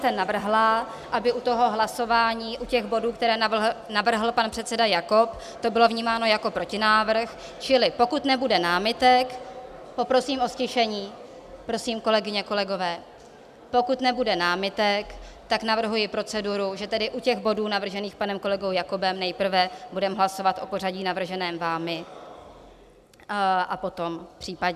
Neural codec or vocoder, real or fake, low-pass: none; real; 14.4 kHz